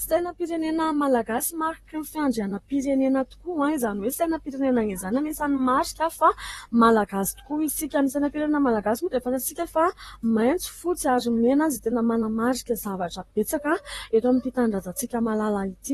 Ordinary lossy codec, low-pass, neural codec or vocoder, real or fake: AAC, 32 kbps; 19.8 kHz; vocoder, 44.1 kHz, 128 mel bands, Pupu-Vocoder; fake